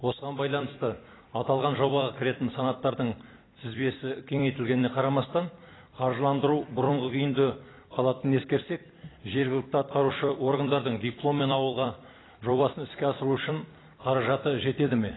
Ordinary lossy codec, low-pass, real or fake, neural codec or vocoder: AAC, 16 kbps; 7.2 kHz; fake; vocoder, 44.1 kHz, 128 mel bands every 256 samples, BigVGAN v2